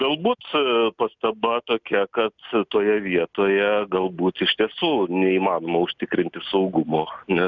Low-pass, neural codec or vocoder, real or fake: 7.2 kHz; none; real